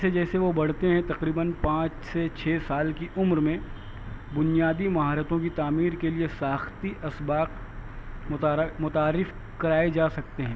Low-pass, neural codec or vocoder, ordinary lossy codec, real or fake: none; none; none; real